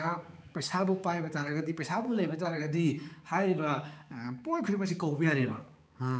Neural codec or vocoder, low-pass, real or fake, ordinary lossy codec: codec, 16 kHz, 4 kbps, X-Codec, HuBERT features, trained on balanced general audio; none; fake; none